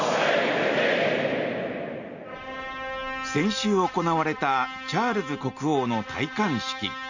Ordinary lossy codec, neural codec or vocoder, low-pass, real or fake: none; none; 7.2 kHz; real